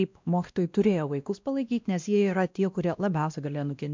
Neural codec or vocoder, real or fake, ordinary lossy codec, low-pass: codec, 16 kHz, 1 kbps, X-Codec, WavLM features, trained on Multilingual LibriSpeech; fake; MP3, 64 kbps; 7.2 kHz